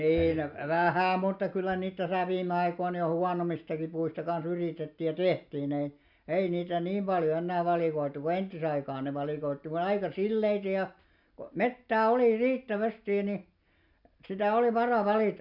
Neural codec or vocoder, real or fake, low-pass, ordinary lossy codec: none; real; 5.4 kHz; none